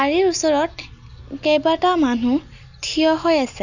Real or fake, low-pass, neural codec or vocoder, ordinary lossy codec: real; 7.2 kHz; none; none